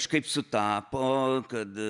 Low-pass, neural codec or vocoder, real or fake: 10.8 kHz; none; real